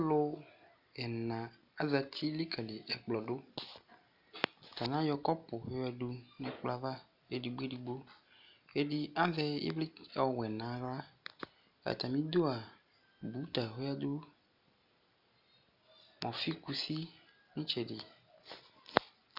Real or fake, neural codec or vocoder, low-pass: real; none; 5.4 kHz